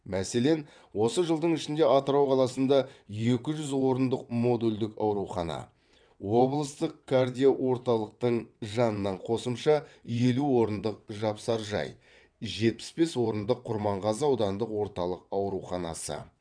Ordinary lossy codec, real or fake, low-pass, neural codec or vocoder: none; fake; 9.9 kHz; vocoder, 22.05 kHz, 80 mel bands, WaveNeXt